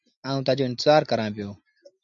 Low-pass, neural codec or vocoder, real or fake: 7.2 kHz; none; real